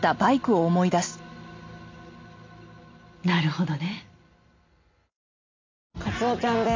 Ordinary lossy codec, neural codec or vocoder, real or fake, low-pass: MP3, 64 kbps; none; real; 7.2 kHz